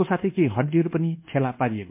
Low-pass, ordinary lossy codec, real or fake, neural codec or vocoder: 3.6 kHz; MP3, 32 kbps; fake; codec, 24 kHz, 1.2 kbps, DualCodec